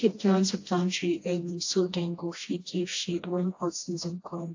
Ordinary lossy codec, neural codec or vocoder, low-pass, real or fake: AAC, 48 kbps; codec, 16 kHz, 1 kbps, FreqCodec, smaller model; 7.2 kHz; fake